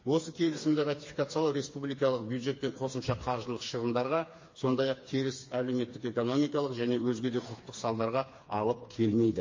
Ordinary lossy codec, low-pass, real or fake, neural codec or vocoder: MP3, 32 kbps; 7.2 kHz; fake; codec, 16 kHz, 4 kbps, FreqCodec, smaller model